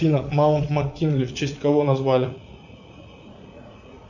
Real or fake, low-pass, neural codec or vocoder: fake; 7.2 kHz; vocoder, 44.1 kHz, 80 mel bands, Vocos